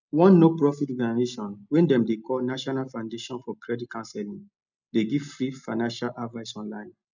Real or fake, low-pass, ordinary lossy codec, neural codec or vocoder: real; 7.2 kHz; none; none